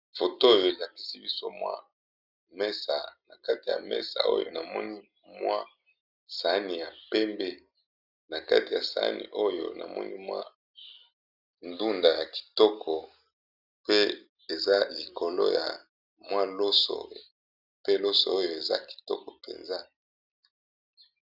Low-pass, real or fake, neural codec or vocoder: 5.4 kHz; real; none